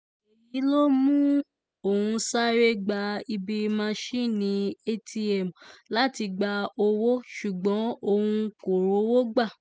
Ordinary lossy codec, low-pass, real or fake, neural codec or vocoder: none; none; real; none